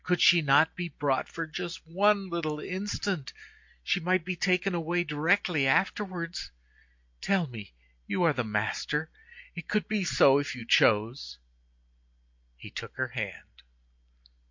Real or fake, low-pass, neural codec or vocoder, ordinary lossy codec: real; 7.2 kHz; none; MP3, 48 kbps